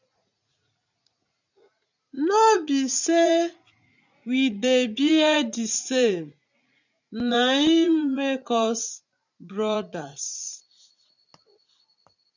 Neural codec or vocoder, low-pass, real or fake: vocoder, 44.1 kHz, 80 mel bands, Vocos; 7.2 kHz; fake